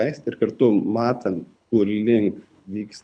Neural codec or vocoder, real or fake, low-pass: codec, 24 kHz, 6 kbps, HILCodec; fake; 9.9 kHz